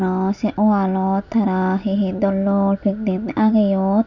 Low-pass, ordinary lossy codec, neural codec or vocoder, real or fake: 7.2 kHz; none; none; real